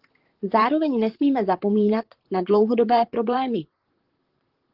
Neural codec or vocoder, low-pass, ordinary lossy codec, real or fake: vocoder, 44.1 kHz, 128 mel bands, Pupu-Vocoder; 5.4 kHz; Opus, 16 kbps; fake